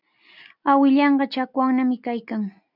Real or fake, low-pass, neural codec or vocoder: real; 5.4 kHz; none